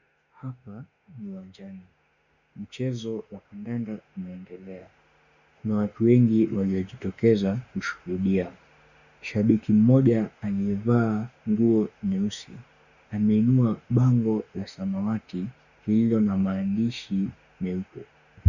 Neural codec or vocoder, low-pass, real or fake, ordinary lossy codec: autoencoder, 48 kHz, 32 numbers a frame, DAC-VAE, trained on Japanese speech; 7.2 kHz; fake; Opus, 64 kbps